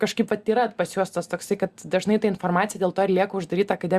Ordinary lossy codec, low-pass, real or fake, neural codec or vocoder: Opus, 64 kbps; 14.4 kHz; real; none